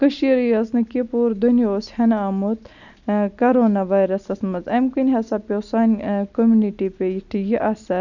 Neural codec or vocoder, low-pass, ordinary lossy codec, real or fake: none; 7.2 kHz; none; real